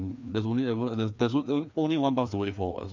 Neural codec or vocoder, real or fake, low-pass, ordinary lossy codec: codec, 16 kHz, 2 kbps, FreqCodec, larger model; fake; 7.2 kHz; MP3, 64 kbps